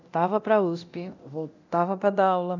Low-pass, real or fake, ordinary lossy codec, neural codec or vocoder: 7.2 kHz; fake; none; codec, 24 kHz, 0.9 kbps, DualCodec